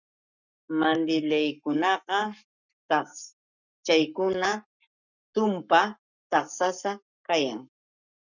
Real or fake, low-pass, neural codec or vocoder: fake; 7.2 kHz; codec, 44.1 kHz, 7.8 kbps, Pupu-Codec